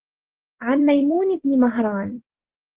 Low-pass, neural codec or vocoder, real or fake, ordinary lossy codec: 3.6 kHz; vocoder, 24 kHz, 100 mel bands, Vocos; fake; Opus, 16 kbps